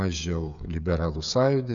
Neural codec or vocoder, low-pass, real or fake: codec, 16 kHz, 8 kbps, FreqCodec, smaller model; 7.2 kHz; fake